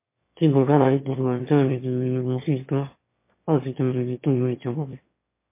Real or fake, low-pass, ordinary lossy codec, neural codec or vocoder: fake; 3.6 kHz; MP3, 24 kbps; autoencoder, 22.05 kHz, a latent of 192 numbers a frame, VITS, trained on one speaker